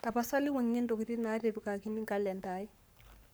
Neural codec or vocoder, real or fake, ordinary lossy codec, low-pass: codec, 44.1 kHz, 7.8 kbps, Pupu-Codec; fake; none; none